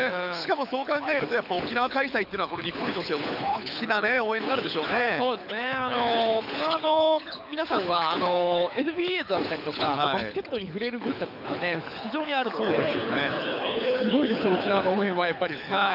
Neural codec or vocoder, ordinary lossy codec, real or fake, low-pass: codec, 24 kHz, 6 kbps, HILCodec; none; fake; 5.4 kHz